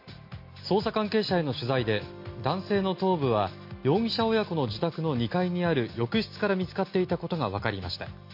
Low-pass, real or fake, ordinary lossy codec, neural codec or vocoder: 5.4 kHz; real; MP3, 32 kbps; none